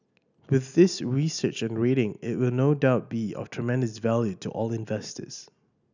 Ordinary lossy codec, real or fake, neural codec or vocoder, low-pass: none; real; none; 7.2 kHz